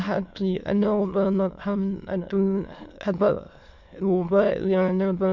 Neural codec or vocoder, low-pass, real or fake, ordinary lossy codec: autoencoder, 22.05 kHz, a latent of 192 numbers a frame, VITS, trained on many speakers; 7.2 kHz; fake; MP3, 48 kbps